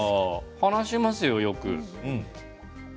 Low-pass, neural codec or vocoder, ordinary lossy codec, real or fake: none; none; none; real